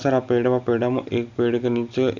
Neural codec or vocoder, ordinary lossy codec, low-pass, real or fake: none; none; 7.2 kHz; real